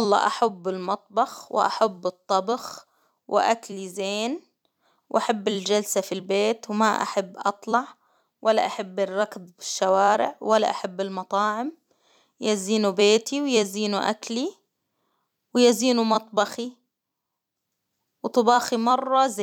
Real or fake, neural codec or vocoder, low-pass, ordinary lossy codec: fake; vocoder, 44.1 kHz, 128 mel bands every 256 samples, BigVGAN v2; 19.8 kHz; none